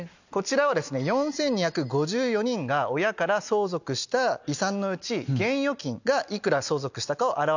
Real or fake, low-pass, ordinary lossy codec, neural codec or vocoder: real; 7.2 kHz; none; none